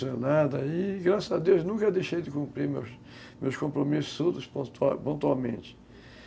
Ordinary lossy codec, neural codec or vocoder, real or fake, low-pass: none; none; real; none